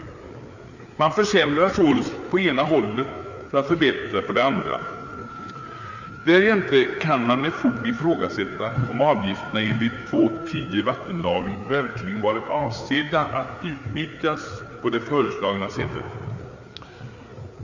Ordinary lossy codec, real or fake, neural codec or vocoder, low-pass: none; fake; codec, 16 kHz, 4 kbps, FreqCodec, larger model; 7.2 kHz